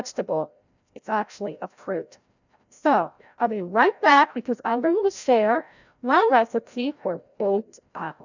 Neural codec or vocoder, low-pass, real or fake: codec, 16 kHz, 0.5 kbps, FreqCodec, larger model; 7.2 kHz; fake